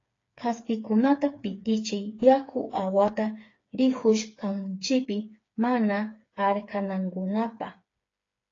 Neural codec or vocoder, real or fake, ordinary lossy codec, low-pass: codec, 16 kHz, 4 kbps, FreqCodec, smaller model; fake; AAC, 32 kbps; 7.2 kHz